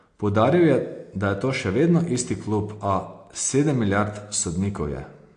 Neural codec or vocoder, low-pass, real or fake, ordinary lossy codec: none; 9.9 kHz; real; AAC, 48 kbps